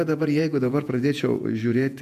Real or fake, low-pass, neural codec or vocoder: fake; 14.4 kHz; vocoder, 48 kHz, 128 mel bands, Vocos